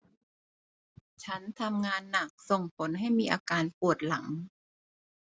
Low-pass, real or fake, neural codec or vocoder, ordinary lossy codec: none; real; none; none